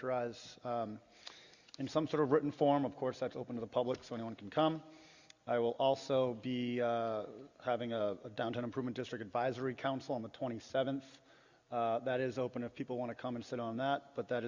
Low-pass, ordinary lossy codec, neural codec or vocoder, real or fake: 7.2 kHz; Opus, 64 kbps; none; real